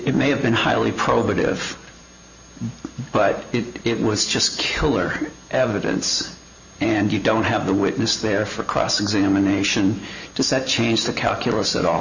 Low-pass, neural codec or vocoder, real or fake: 7.2 kHz; vocoder, 44.1 kHz, 128 mel bands every 512 samples, BigVGAN v2; fake